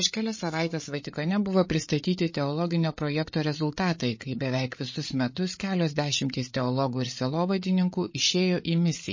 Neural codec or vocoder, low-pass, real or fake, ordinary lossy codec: codec, 16 kHz, 8 kbps, FreqCodec, larger model; 7.2 kHz; fake; MP3, 32 kbps